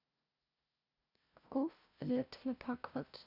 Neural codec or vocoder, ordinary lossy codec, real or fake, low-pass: codec, 16 kHz, 0.5 kbps, FunCodec, trained on LibriTTS, 25 frames a second; none; fake; 5.4 kHz